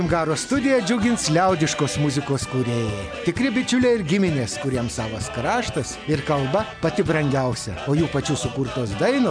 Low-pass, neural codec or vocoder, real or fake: 9.9 kHz; none; real